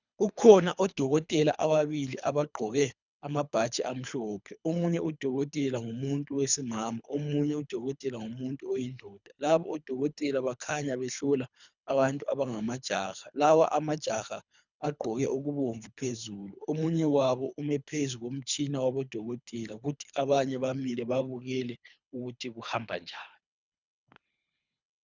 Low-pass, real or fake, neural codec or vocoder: 7.2 kHz; fake; codec, 24 kHz, 3 kbps, HILCodec